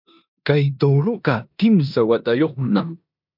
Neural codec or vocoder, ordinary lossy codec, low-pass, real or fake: codec, 16 kHz in and 24 kHz out, 0.9 kbps, LongCat-Audio-Codec, four codebook decoder; MP3, 48 kbps; 5.4 kHz; fake